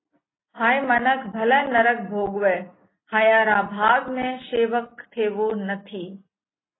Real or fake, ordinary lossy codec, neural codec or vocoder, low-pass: real; AAC, 16 kbps; none; 7.2 kHz